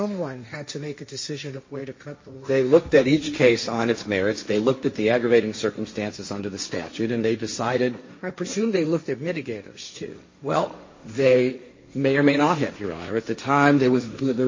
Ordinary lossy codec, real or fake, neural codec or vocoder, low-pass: MP3, 32 kbps; fake; codec, 16 kHz, 1.1 kbps, Voila-Tokenizer; 7.2 kHz